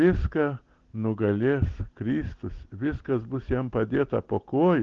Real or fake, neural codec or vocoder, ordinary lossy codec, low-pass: real; none; Opus, 16 kbps; 7.2 kHz